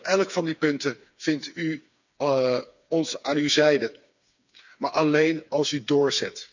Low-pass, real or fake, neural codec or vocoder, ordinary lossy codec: 7.2 kHz; fake; codec, 16 kHz, 4 kbps, FreqCodec, smaller model; none